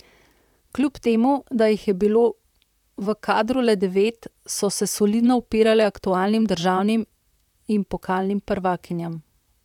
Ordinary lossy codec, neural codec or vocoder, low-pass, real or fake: none; vocoder, 44.1 kHz, 128 mel bands, Pupu-Vocoder; 19.8 kHz; fake